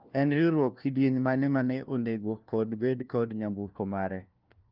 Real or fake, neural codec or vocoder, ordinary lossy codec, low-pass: fake; codec, 16 kHz, 1 kbps, FunCodec, trained on LibriTTS, 50 frames a second; Opus, 32 kbps; 5.4 kHz